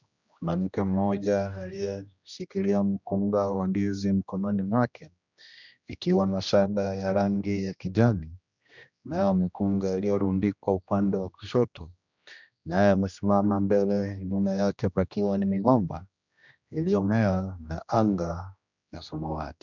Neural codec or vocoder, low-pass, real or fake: codec, 16 kHz, 1 kbps, X-Codec, HuBERT features, trained on general audio; 7.2 kHz; fake